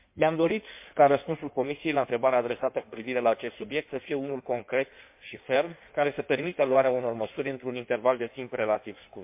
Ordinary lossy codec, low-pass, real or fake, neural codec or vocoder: MP3, 32 kbps; 3.6 kHz; fake; codec, 16 kHz in and 24 kHz out, 1.1 kbps, FireRedTTS-2 codec